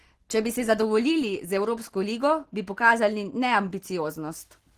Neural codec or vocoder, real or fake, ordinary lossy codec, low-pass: none; real; Opus, 16 kbps; 14.4 kHz